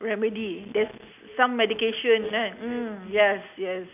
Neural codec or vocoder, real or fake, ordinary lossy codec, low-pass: none; real; none; 3.6 kHz